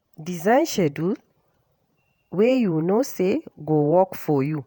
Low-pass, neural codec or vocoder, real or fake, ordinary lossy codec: none; vocoder, 48 kHz, 128 mel bands, Vocos; fake; none